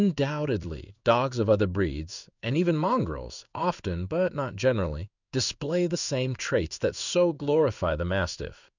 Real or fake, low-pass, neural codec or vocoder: fake; 7.2 kHz; codec, 16 kHz in and 24 kHz out, 1 kbps, XY-Tokenizer